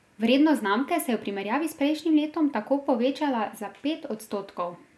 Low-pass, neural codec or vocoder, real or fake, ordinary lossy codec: none; none; real; none